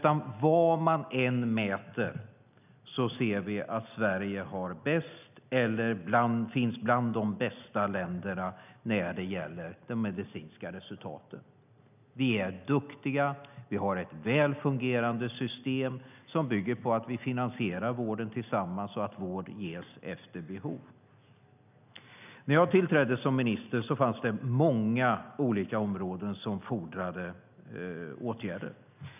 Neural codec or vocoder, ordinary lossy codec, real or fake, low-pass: none; none; real; 3.6 kHz